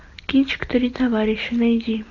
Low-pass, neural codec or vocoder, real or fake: 7.2 kHz; none; real